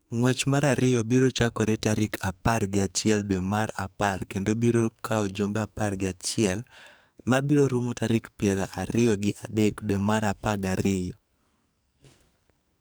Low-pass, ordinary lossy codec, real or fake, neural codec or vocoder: none; none; fake; codec, 44.1 kHz, 2.6 kbps, SNAC